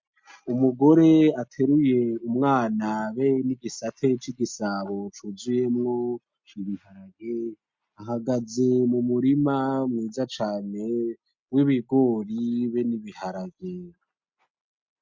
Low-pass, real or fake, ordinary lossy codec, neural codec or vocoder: 7.2 kHz; real; MP3, 48 kbps; none